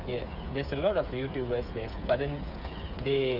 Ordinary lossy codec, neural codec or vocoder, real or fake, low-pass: none; codec, 16 kHz, 8 kbps, FreqCodec, smaller model; fake; 5.4 kHz